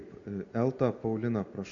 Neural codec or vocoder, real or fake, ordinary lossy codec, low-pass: none; real; AAC, 64 kbps; 7.2 kHz